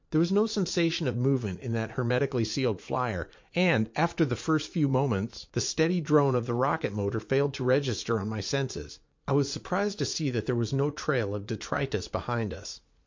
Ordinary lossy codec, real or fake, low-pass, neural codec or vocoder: MP3, 48 kbps; fake; 7.2 kHz; vocoder, 44.1 kHz, 80 mel bands, Vocos